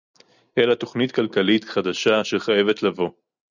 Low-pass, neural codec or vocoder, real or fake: 7.2 kHz; none; real